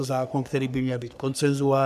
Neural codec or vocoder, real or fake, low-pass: codec, 44.1 kHz, 3.4 kbps, Pupu-Codec; fake; 14.4 kHz